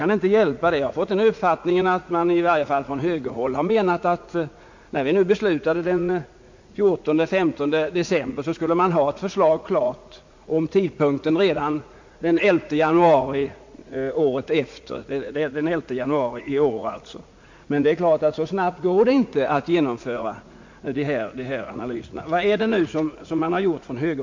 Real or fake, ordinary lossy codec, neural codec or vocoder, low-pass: fake; MP3, 48 kbps; vocoder, 44.1 kHz, 80 mel bands, Vocos; 7.2 kHz